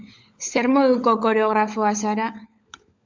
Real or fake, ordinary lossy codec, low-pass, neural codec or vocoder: fake; MP3, 64 kbps; 7.2 kHz; codec, 16 kHz, 16 kbps, FunCodec, trained on LibriTTS, 50 frames a second